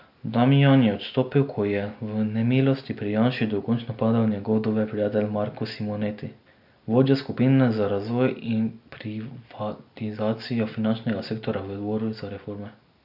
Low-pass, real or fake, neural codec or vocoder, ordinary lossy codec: 5.4 kHz; real; none; AAC, 48 kbps